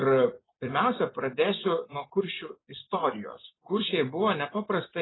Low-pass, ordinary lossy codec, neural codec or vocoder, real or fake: 7.2 kHz; AAC, 16 kbps; none; real